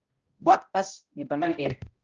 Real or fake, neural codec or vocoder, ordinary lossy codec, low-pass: fake; codec, 16 kHz, 0.5 kbps, X-Codec, HuBERT features, trained on balanced general audio; Opus, 24 kbps; 7.2 kHz